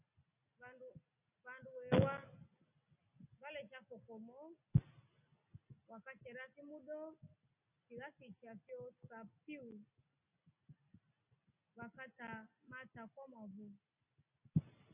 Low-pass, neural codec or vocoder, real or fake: 3.6 kHz; none; real